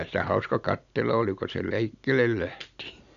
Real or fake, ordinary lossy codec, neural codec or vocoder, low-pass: real; none; none; 7.2 kHz